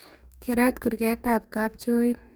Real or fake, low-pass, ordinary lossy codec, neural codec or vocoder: fake; none; none; codec, 44.1 kHz, 2.6 kbps, DAC